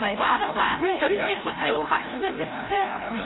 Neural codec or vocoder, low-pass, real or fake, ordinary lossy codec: codec, 16 kHz, 0.5 kbps, FreqCodec, larger model; 7.2 kHz; fake; AAC, 16 kbps